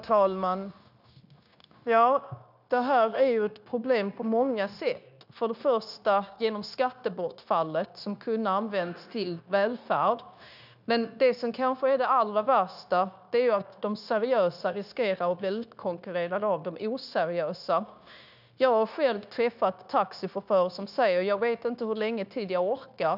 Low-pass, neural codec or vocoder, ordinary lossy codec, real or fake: 5.4 kHz; codec, 16 kHz, 0.9 kbps, LongCat-Audio-Codec; none; fake